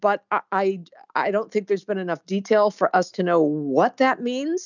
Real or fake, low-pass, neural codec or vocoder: real; 7.2 kHz; none